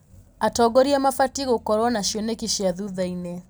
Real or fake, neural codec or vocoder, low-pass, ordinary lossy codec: real; none; none; none